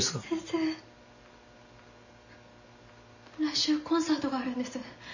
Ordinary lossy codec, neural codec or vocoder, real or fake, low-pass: none; none; real; 7.2 kHz